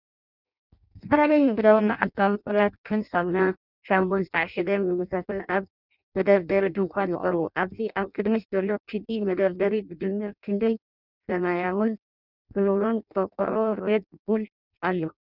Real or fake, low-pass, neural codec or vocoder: fake; 5.4 kHz; codec, 16 kHz in and 24 kHz out, 0.6 kbps, FireRedTTS-2 codec